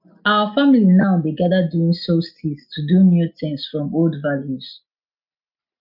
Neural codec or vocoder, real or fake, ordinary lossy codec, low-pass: none; real; none; 5.4 kHz